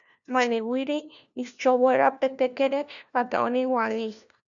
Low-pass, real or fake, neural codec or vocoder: 7.2 kHz; fake; codec, 16 kHz, 1 kbps, FunCodec, trained on LibriTTS, 50 frames a second